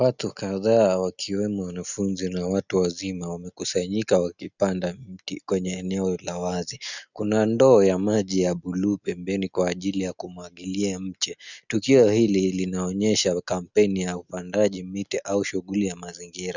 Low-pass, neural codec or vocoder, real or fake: 7.2 kHz; none; real